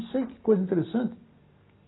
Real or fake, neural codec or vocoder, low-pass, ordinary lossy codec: real; none; 7.2 kHz; AAC, 16 kbps